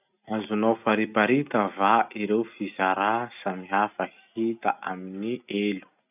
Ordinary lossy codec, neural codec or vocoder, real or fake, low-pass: none; none; real; 3.6 kHz